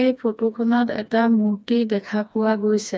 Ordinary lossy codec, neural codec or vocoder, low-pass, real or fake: none; codec, 16 kHz, 2 kbps, FreqCodec, smaller model; none; fake